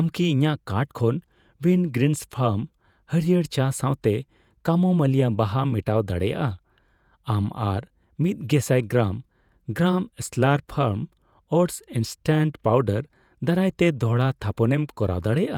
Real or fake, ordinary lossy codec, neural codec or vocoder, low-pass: fake; none; vocoder, 48 kHz, 128 mel bands, Vocos; 19.8 kHz